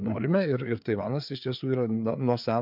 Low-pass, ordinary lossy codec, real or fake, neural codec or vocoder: 5.4 kHz; MP3, 48 kbps; fake; codec, 16 kHz, 4 kbps, FreqCodec, larger model